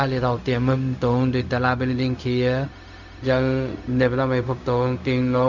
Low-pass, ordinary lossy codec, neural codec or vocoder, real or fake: 7.2 kHz; none; codec, 16 kHz, 0.4 kbps, LongCat-Audio-Codec; fake